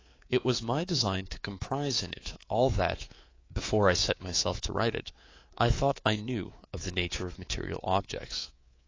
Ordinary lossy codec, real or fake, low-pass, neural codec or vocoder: AAC, 32 kbps; fake; 7.2 kHz; codec, 24 kHz, 3.1 kbps, DualCodec